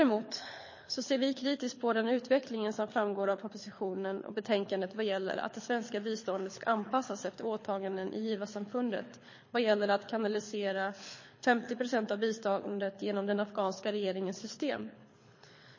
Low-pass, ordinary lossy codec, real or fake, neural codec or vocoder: 7.2 kHz; MP3, 32 kbps; fake; codec, 24 kHz, 6 kbps, HILCodec